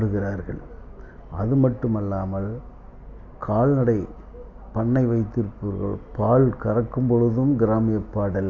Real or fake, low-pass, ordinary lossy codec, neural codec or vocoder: real; 7.2 kHz; none; none